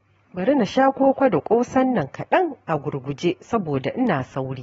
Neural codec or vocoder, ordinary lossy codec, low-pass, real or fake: none; AAC, 24 kbps; 19.8 kHz; real